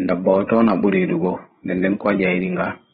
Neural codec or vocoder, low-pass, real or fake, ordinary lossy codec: codec, 16 kHz, 8 kbps, FreqCodec, larger model; 7.2 kHz; fake; AAC, 16 kbps